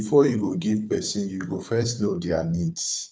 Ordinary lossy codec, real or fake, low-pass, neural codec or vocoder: none; fake; none; codec, 16 kHz, 4 kbps, FunCodec, trained on Chinese and English, 50 frames a second